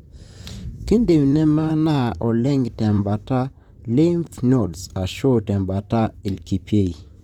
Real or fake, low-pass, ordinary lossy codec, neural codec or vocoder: fake; 19.8 kHz; none; vocoder, 44.1 kHz, 128 mel bands, Pupu-Vocoder